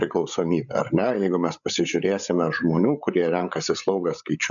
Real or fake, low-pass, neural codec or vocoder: fake; 7.2 kHz; codec, 16 kHz, 8 kbps, FreqCodec, larger model